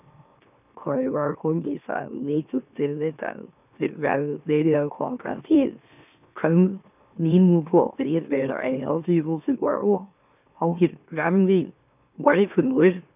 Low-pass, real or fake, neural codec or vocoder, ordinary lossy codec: 3.6 kHz; fake; autoencoder, 44.1 kHz, a latent of 192 numbers a frame, MeloTTS; none